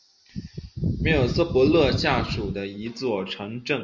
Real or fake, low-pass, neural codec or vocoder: real; 7.2 kHz; none